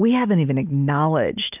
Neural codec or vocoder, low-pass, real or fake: none; 3.6 kHz; real